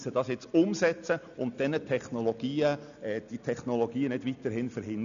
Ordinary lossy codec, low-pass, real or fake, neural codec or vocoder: none; 7.2 kHz; real; none